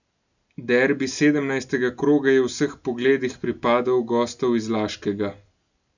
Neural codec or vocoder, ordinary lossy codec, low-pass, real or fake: none; none; 7.2 kHz; real